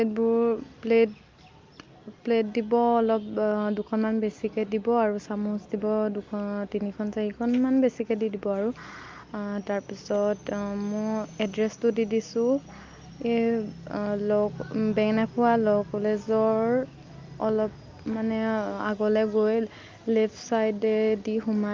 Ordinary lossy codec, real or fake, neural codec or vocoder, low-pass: Opus, 32 kbps; real; none; 7.2 kHz